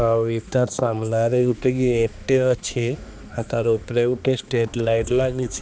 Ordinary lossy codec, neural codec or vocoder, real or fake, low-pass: none; codec, 16 kHz, 2 kbps, X-Codec, HuBERT features, trained on balanced general audio; fake; none